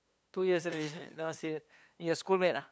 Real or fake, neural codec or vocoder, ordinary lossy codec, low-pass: fake; codec, 16 kHz, 2 kbps, FunCodec, trained on LibriTTS, 25 frames a second; none; none